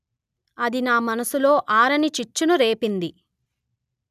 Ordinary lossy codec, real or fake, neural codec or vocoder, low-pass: none; real; none; 14.4 kHz